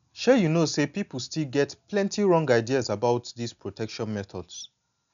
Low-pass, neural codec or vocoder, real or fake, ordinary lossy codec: 7.2 kHz; none; real; none